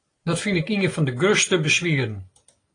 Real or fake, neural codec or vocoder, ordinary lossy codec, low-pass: real; none; AAC, 32 kbps; 9.9 kHz